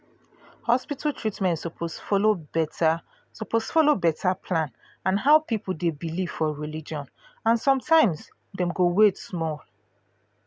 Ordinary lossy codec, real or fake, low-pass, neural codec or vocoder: none; real; none; none